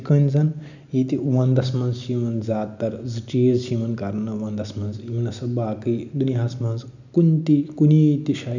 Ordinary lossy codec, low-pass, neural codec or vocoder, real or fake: none; 7.2 kHz; none; real